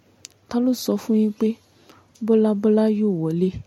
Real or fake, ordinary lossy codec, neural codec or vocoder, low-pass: real; MP3, 64 kbps; none; 19.8 kHz